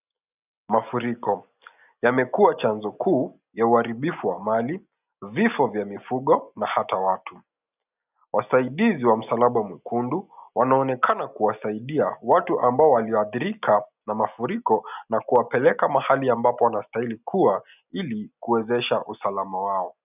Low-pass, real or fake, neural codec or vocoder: 3.6 kHz; real; none